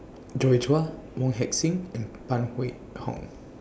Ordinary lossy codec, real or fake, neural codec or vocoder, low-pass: none; real; none; none